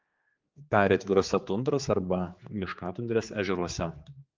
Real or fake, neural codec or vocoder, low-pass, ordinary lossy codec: fake; codec, 16 kHz, 4 kbps, X-Codec, HuBERT features, trained on general audio; 7.2 kHz; Opus, 32 kbps